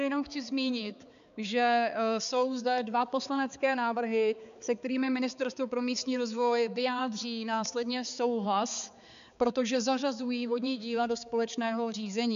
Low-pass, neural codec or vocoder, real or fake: 7.2 kHz; codec, 16 kHz, 4 kbps, X-Codec, HuBERT features, trained on balanced general audio; fake